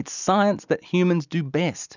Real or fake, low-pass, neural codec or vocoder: real; 7.2 kHz; none